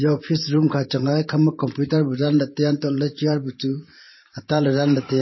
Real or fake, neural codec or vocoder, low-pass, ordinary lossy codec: fake; vocoder, 44.1 kHz, 128 mel bands every 512 samples, BigVGAN v2; 7.2 kHz; MP3, 24 kbps